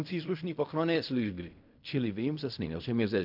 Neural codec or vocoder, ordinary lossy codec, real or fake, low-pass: codec, 16 kHz in and 24 kHz out, 0.4 kbps, LongCat-Audio-Codec, fine tuned four codebook decoder; AAC, 48 kbps; fake; 5.4 kHz